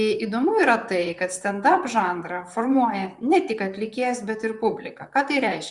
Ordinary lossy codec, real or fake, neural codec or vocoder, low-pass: Opus, 64 kbps; fake; vocoder, 44.1 kHz, 128 mel bands, Pupu-Vocoder; 10.8 kHz